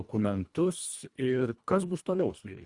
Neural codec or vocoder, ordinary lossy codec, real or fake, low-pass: codec, 24 kHz, 1.5 kbps, HILCodec; Opus, 64 kbps; fake; 10.8 kHz